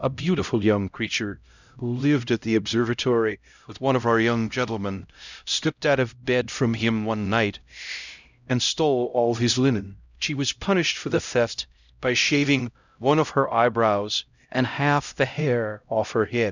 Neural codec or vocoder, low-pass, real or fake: codec, 16 kHz, 0.5 kbps, X-Codec, HuBERT features, trained on LibriSpeech; 7.2 kHz; fake